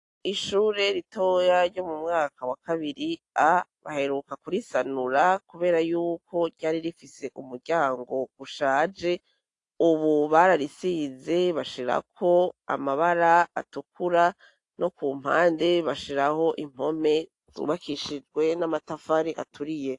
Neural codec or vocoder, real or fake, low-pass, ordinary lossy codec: none; real; 10.8 kHz; AAC, 48 kbps